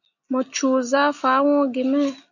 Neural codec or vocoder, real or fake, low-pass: none; real; 7.2 kHz